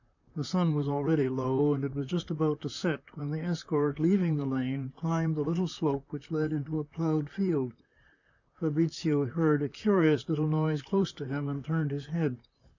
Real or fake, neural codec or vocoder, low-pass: fake; vocoder, 44.1 kHz, 128 mel bands, Pupu-Vocoder; 7.2 kHz